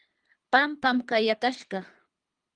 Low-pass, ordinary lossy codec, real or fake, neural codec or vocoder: 9.9 kHz; Opus, 32 kbps; fake; codec, 24 kHz, 3 kbps, HILCodec